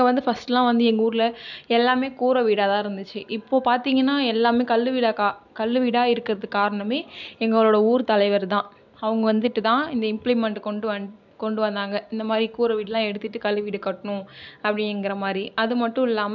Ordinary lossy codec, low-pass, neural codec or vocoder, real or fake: none; 7.2 kHz; none; real